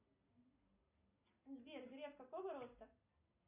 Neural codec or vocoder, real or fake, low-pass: none; real; 3.6 kHz